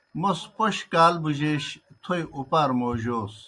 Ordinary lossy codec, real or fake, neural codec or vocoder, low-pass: Opus, 64 kbps; real; none; 10.8 kHz